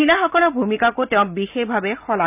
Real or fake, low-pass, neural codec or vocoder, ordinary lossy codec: real; 3.6 kHz; none; none